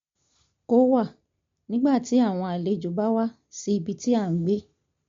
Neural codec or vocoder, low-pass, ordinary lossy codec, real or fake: none; 7.2 kHz; MP3, 48 kbps; real